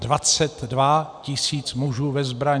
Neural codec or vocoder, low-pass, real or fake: none; 9.9 kHz; real